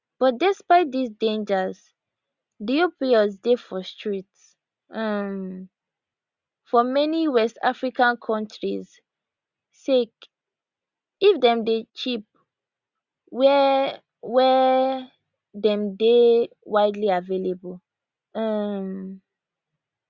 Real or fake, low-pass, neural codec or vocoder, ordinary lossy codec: real; 7.2 kHz; none; Opus, 64 kbps